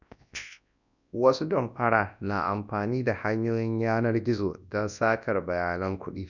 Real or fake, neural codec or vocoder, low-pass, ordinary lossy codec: fake; codec, 24 kHz, 0.9 kbps, WavTokenizer, large speech release; 7.2 kHz; none